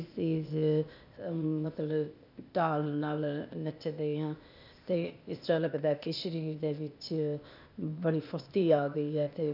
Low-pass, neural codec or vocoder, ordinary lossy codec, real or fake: 5.4 kHz; codec, 16 kHz, 0.8 kbps, ZipCodec; none; fake